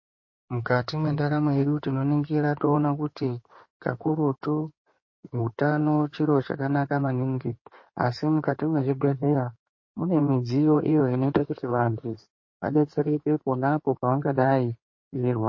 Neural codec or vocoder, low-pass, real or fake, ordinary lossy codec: codec, 16 kHz in and 24 kHz out, 2.2 kbps, FireRedTTS-2 codec; 7.2 kHz; fake; MP3, 32 kbps